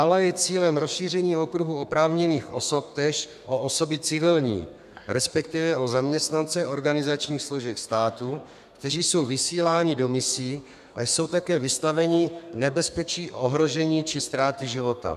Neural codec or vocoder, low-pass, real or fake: codec, 32 kHz, 1.9 kbps, SNAC; 14.4 kHz; fake